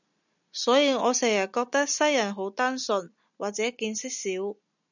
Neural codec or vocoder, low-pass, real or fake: none; 7.2 kHz; real